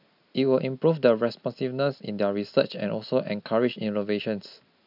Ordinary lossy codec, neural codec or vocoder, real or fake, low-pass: none; none; real; 5.4 kHz